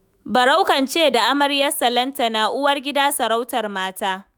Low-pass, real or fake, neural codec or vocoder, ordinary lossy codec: none; fake; autoencoder, 48 kHz, 128 numbers a frame, DAC-VAE, trained on Japanese speech; none